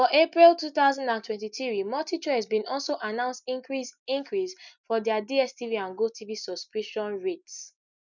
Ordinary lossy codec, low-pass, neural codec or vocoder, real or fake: none; 7.2 kHz; none; real